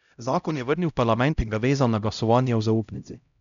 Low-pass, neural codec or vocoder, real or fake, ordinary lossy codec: 7.2 kHz; codec, 16 kHz, 0.5 kbps, X-Codec, HuBERT features, trained on LibriSpeech; fake; none